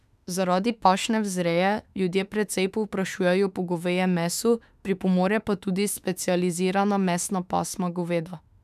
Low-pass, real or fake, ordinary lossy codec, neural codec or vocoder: 14.4 kHz; fake; none; autoencoder, 48 kHz, 32 numbers a frame, DAC-VAE, trained on Japanese speech